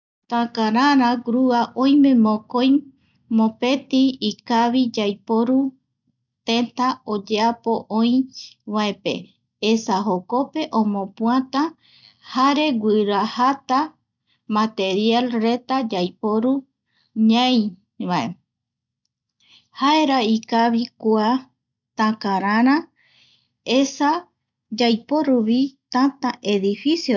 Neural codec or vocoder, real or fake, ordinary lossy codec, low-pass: none; real; none; 7.2 kHz